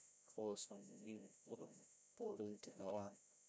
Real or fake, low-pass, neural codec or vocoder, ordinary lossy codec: fake; none; codec, 16 kHz, 0.5 kbps, FreqCodec, larger model; none